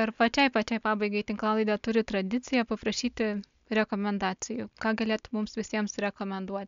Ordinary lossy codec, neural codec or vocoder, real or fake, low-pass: MP3, 64 kbps; none; real; 7.2 kHz